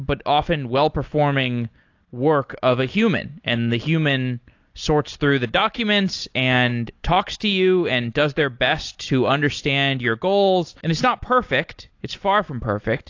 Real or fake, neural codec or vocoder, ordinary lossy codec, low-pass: real; none; AAC, 48 kbps; 7.2 kHz